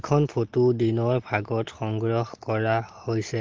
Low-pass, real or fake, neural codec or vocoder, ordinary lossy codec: 7.2 kHz; real; none; Opus, 16 kbps